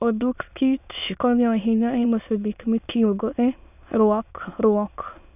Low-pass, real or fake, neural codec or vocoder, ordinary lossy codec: 3.6 kHz; fake; autoencoder, 22.05 kHz, a latent of 192 numbers a frame, VITS, trained on many speakers; none